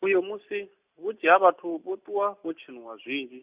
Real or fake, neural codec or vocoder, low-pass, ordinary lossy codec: real; none; 3.6 kHz; Opus, 64 kbps